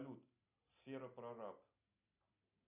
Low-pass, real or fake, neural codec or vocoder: 3.6 kHz; real; none